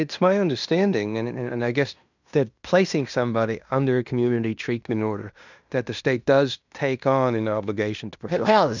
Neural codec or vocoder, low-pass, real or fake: codec, 16 kHz in and 24 kHz out, 0.9 kbps, LongCat-Audio-Codec, fine tuned four codebook decoder; 7.2 kHz; fake